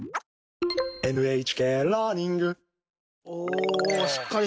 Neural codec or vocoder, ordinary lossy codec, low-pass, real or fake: none; none; none; real